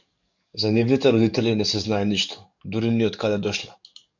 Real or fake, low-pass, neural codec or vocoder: fake; 7.2 kHz; codec, 44.1 kHz, 7.8 kbps, DAC